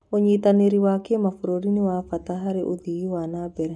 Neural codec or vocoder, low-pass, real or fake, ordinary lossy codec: none; none; real; none